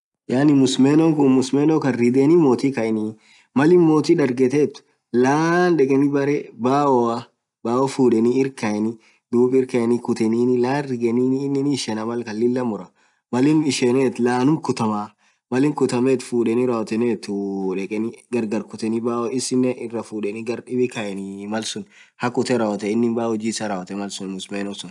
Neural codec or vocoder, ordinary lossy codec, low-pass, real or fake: none; none; 10.8 kHz; real